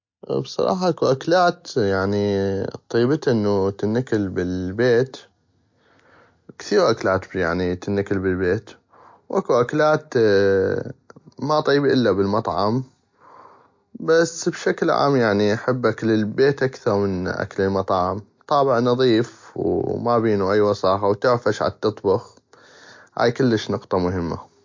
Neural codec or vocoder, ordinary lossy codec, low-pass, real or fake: none; MP3, 48 kbps; 7.2 kHz; real